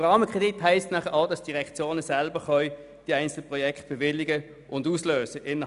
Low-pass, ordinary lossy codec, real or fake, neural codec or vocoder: 10.8 kHz; none; real; none